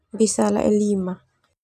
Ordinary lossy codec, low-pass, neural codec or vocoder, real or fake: none; 14.4 kHz; none; real